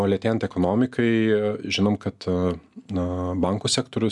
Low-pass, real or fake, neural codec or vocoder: 10.8 kHz; real; none